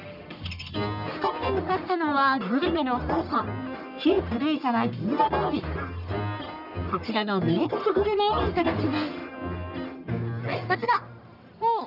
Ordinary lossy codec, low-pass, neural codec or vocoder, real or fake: none; 5.4 kHz; codec, 44.1 kHz, 1.7 kbps, Pupu-Codec; fake